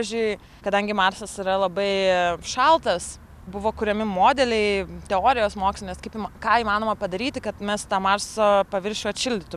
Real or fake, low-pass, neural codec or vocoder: real; 14.4 kHz; none